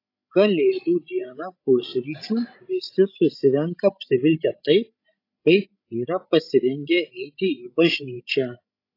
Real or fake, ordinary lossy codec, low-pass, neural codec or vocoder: fake; AAC, 32 kbps; 5.4 kHz; codec, 16 kHz, 16 kbps, FreqCodec, larger model